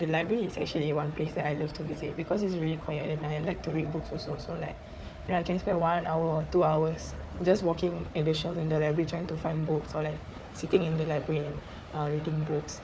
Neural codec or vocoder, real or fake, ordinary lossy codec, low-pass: codec, 16 kHz, 4 kbps, FunCodec, trained on Chinese and English, 50 frames a second; fake; none; none